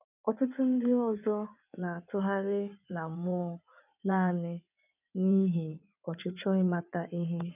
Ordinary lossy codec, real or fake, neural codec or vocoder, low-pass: none; fake; codec, 16 kHz in and 24 kHz out, 2.2 kbps, FireRedTTS-2 codec; 3.6 kHz